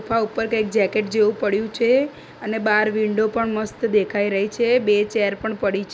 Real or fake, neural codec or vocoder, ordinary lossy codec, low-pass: real; none; none; none